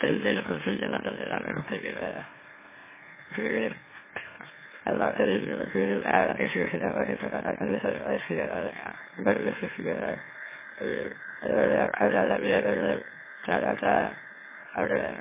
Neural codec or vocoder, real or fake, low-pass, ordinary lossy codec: autoencoder, 44.1 kHz, a latent of 192 numbers a frame, MeloTTS; fake; 3.6 kHz; MP3, 16 kbps